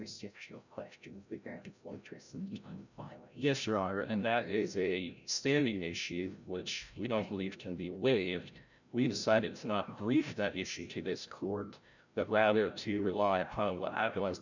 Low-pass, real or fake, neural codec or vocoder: 7.2 kHz; fake; codec, 16 kHz, 0.5 kbps, FreqCodec, larger model